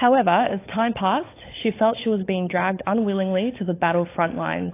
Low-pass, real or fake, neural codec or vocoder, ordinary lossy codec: 3.6 kHz; fake; codec, 16 kHz, 16 kbps, FunCodec, trained on LibriTTS, 50 frames a second; AAC, 24 kbps